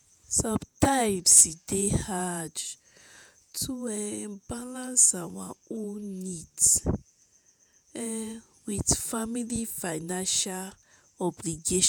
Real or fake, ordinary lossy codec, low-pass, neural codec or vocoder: fake; none; none; vocoder, 48 kHz, 128 mel bands, Vocos